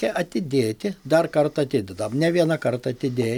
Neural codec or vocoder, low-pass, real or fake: none; 19.8 kHz; real